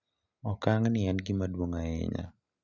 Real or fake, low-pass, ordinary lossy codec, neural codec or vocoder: real; 7.2 kHz; none; none